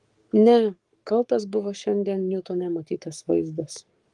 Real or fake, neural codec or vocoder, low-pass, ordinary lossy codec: fake; codec, 44.1 kHz, 7.8 kbps, Pupu-Codec; 10.8 kHz; Opus, 24 kbps